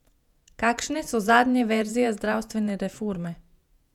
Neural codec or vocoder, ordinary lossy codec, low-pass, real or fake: vocoder, 48 kHz, 128 mel bands, Vocos; none; 19.8 kHz; fake